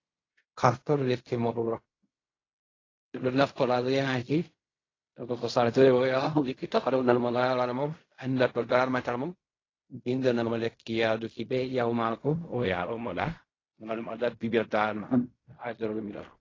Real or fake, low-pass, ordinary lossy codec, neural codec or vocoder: fake; 7.2 kHz; AAC, 32 kbps; codec, 16 kHz in and 24 kHz out, 0.4 kbps, LongCat-Audio-Codec, fine tuned four codebook decoder